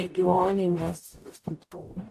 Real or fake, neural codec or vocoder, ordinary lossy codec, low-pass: fake; codec, 44.1 kHz, 0.9 kbps, DAC; AAC, 64 kbps; 14.4 kHz